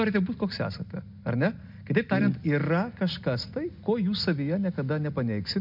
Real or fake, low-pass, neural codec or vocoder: real; 5.4 kHz; none